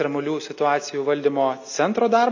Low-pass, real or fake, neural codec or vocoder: 7.2 kHz; real; none